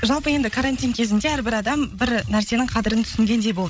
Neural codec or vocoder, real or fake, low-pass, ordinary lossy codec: codec, 16 kHz, 16 kbps, FreqCodec, larger model; fake; none; none